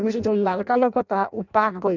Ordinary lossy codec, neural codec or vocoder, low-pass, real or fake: none; codec, 16 kHz in and 24 kHz out, 0.6 kbps, FireRedTTS-2 codec; 7.2 kHz; fake